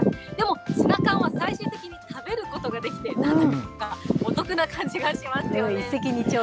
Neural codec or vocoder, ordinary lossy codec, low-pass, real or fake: none; none; none; real